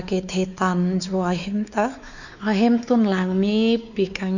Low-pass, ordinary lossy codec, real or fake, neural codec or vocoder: 7.2 kHz; none; fake; codec, 16 kHz, 4 kbps, X-Codec, WavLM features, trained on Multilingual LibriSpeech